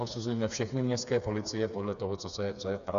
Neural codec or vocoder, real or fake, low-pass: codec, 16 kHz, 4 kbps, FreqCodec, smaller model; fake; 7.2 kHz